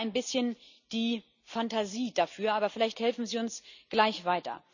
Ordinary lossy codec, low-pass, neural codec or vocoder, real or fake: none; 7.2 kHz; none; real